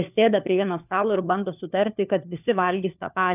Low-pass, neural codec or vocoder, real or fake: 3.6 kHz; codec, 16 kHz, 4 kbps, FunCodec, trained on LibriTTS, 50 frames a second; fake